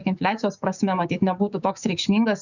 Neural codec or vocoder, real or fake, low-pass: codec, 16 kHz, 6 kbps, DAC; fake; 7.2 kHz